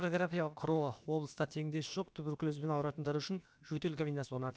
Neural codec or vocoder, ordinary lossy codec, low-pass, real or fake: codec, 16 kHz, about 1 kbps, DyCAST, with the encoder's durations; none; none; fake